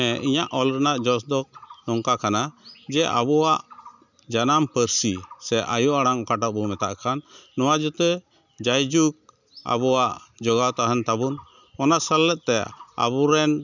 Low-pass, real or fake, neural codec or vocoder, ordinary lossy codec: 7.2 kHz; real; none; none